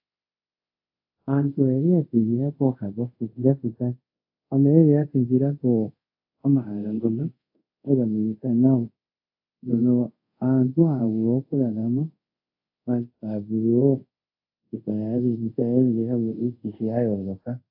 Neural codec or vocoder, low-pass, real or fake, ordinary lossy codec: codec, 24 kHz, 0.5 kbps, DualCodec; 5.4 kHz; fake; AAC, 32 kbps